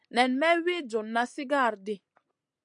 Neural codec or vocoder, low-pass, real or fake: none; 9.9 kHz; real